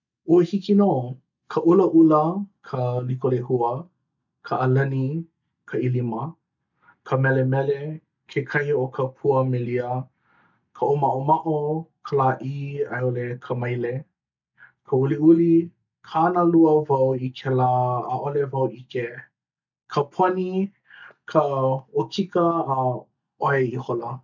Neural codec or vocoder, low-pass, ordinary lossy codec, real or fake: none; 7.2 kHz; none; real